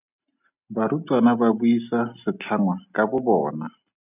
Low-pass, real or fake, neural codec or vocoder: 3.6 kHz; real; none